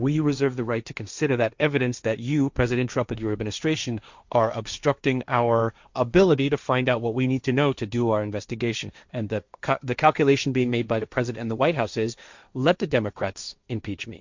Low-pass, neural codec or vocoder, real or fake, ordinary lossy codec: 7.2 kHz; codec, 16 kHz, 1.1 kbps, Voila-Tokenizer; fake; Opus, 64 kbps